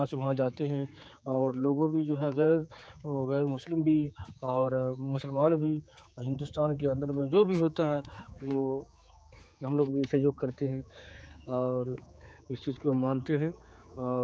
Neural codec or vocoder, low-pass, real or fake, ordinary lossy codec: codec, 16 kHz, 4 kbps, X-Codec, HuBERT features, trained on general audio; none; fake; none